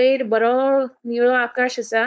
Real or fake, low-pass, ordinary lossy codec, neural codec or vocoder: fake; none; none; codec, 16 kHz, 4.8 kbps, FACodec